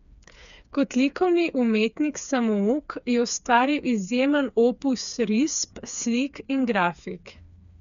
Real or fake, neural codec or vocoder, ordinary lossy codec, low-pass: fake; codec, 16 kHz, 4 kbps, FreqCodec, smaller model; none; 7.2 kHz